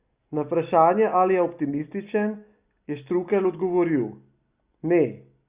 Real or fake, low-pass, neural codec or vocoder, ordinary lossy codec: real; 3.6 kHz; none; Opus, 64 kbps